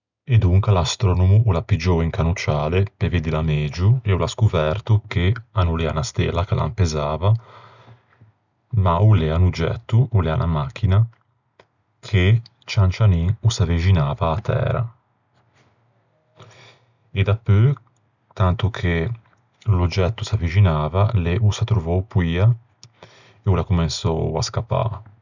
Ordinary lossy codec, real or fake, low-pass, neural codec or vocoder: none; real; 7.2 kHz; none